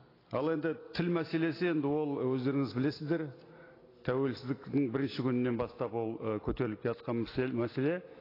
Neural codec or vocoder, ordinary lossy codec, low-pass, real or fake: none; AAC, 24 kbps; 5.4 kHz; real